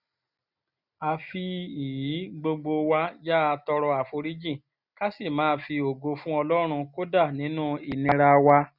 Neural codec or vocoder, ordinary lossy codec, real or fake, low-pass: none; none; real; 5.4 kHz